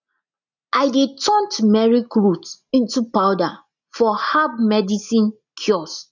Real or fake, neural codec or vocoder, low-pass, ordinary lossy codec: real; none; 7.2 kHz; none